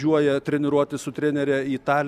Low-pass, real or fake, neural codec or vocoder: 14.4 kHz; real; none